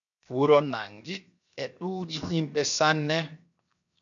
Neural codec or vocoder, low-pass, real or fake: codec, 16 kHz, 0.7 kbps, FocalCodec; 7.2 kHz; fake